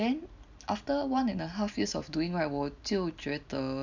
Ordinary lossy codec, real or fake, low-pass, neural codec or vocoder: none; real; 7.2 kHz; none